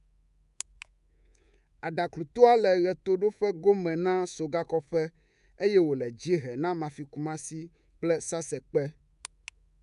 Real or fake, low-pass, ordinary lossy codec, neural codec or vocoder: fake; 10.8 kHz; none; codec, 24 kHz, 3.1 kbps, DualCodec